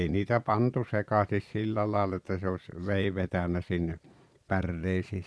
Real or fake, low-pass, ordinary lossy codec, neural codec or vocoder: fake; none; none; vocoder, 22.05 kHz, 80 mel bands, Vocos